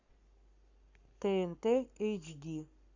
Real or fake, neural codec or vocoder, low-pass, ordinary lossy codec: fake; codec, 44.1 kHz, 7.8 kbps, Pupu-Codec; 7.2 kHz; Opus, 64 kbps